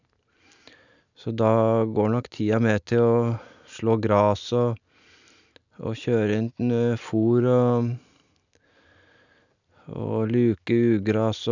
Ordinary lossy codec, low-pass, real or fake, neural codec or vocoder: none; 7.2 kHz; real; none